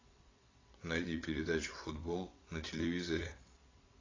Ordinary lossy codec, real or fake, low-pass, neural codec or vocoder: AAC, 32 kbps; fake; 7.2 kHz; vocoder, 22.05 kHz, 80 mel bands, WaveNeXt